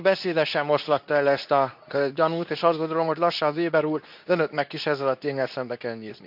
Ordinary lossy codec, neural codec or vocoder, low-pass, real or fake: none; codec, 24 kHz, 0.9 kbps, WavTokenizer, medium speech release version 2; 5.4 kHz; fake